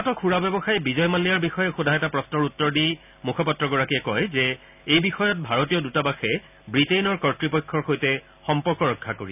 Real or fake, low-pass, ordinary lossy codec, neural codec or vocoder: real; 3.6 kHz; none; none